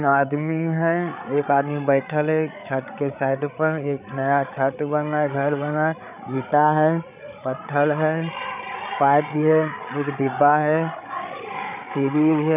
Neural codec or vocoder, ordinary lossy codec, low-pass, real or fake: codec, 16 kHz, 8 kbps, FreqCodec, larger model; none; 3.6 kHz; fake